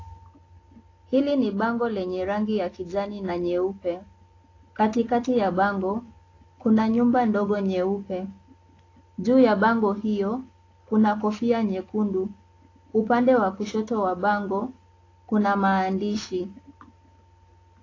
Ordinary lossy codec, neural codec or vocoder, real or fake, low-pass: AAC, 32 kbps; none; real; 7.2 kHz